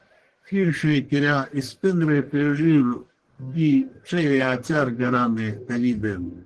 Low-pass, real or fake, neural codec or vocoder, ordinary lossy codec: 10.8 kHz; fake; codec, 44.1 kHz, 1.7 kbps, Pupu-Codec; Opus, 16 kbps